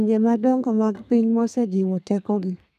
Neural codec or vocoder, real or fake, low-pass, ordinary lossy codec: codec, 32 kHz, 1.9 kbps, SNAC; fake; 14.4 kHz; none